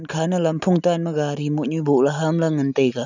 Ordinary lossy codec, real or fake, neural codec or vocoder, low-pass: none; real; none; 7.2 kHz